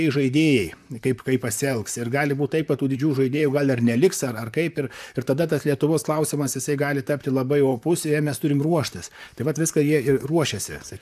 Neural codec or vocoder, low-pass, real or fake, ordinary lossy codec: vocoder, 44.1 kHz, 128 mel bands, Pupu-Vocoder; 14.4 kHz; fake; AAC, 96 kbps